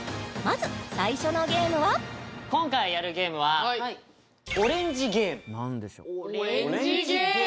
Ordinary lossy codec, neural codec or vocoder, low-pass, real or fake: none; none; none; real